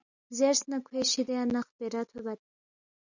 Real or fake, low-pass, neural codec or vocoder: real; 7.2 kHz; none